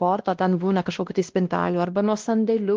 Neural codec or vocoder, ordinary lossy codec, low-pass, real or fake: codec, 16 kHz, 1 kbps, X-Codec, WavLM features, trained on Multilingual LibriSpeech; Opus, 16 kbps; 7.2 kHz; fake